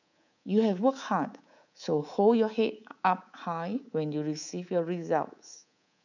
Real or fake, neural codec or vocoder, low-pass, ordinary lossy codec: fake; codec, 24 kHz, 3.1 kbps, DualCodec; 7.2 kHz; none